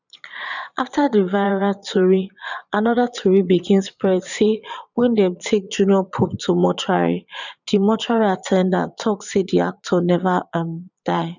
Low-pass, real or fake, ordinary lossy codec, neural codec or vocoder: 7.2 kHz; fake; none; vocoder, 22.05 kHz, 80 mel bands, Vocos